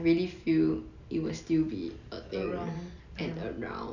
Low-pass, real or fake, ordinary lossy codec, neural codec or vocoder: 7.2 kHz; real; none; none